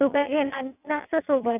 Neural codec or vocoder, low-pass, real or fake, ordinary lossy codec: vocoder, 22.05 kHz, 80 mel bands, WaveNeXt; 3.6 kHz; fake; none